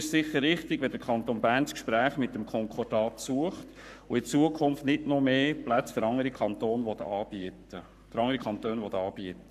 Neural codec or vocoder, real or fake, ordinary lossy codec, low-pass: codec, 44.1 kHz, 7.8 kbps, Pupu-Codec; fake; none; 14.4 kHz